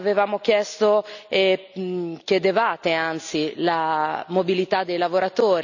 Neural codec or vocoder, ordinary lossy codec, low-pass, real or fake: none; none; 7.2 kHz; real